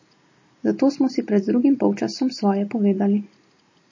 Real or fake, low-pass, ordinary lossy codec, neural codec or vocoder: real; 7.2 kHz; MP3, 32 kbps; none